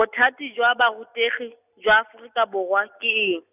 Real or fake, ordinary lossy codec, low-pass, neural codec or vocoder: real; none; 3.6 kHz; none